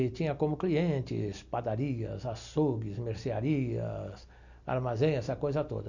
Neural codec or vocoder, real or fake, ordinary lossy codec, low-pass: none; real; none; 7.2 kHz